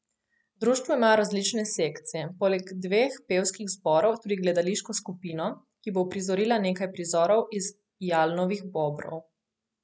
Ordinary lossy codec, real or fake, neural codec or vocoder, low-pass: none; real; none; none